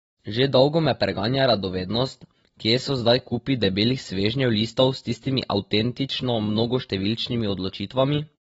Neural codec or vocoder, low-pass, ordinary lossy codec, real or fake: none; 19.8 kHz; AAC, 24 kbps; real